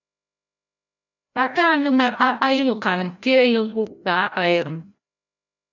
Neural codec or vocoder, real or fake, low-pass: codec, 16 kHz, 0.5 kbps, FreqCodec, larger model; fake; 7.2 kHz